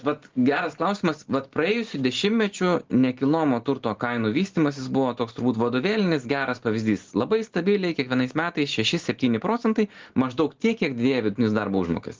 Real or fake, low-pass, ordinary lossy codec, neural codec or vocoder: real; 7.2 kHz; Opus, 16 kbps; none